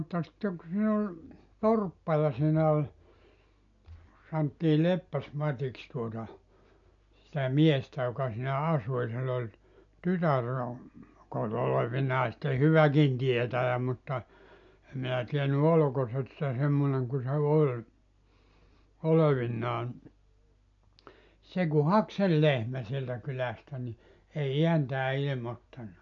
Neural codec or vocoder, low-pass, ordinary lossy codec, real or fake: none; 7.2 kHz; AAC, 64 kbps; real